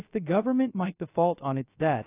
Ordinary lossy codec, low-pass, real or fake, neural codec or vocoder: AAC, 24 kbps; 3.6 kHz; fake; codec, 16 kHz, 0.3 kbps, FocalCodec